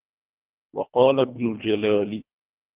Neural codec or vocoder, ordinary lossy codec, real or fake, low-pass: codec, 24 kHz, 1.5 kbps, HILCodec; Opus, 32 kbps; fake; 3.6 kHz